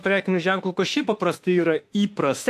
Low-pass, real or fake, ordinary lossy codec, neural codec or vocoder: 14.4 kHz; fake; AAC, 64 kbps; autoencoder, 48 kHz, 32 numbers a frame, DAC-VAE, trained on Japanese speech